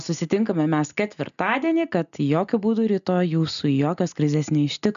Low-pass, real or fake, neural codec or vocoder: 7.2 kHz; real; none